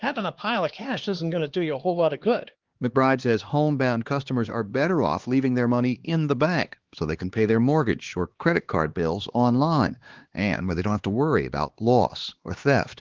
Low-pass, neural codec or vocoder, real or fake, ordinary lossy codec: 7.2 kHz; codec, 16 kHz, 2 kbps, X-Codec, HuBERT features, trained on LibriSpeech; fake; Opus, 16 kbps